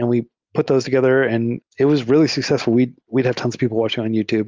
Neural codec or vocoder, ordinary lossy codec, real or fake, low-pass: none; Opus, 24 kbps; real; 7.2 kHz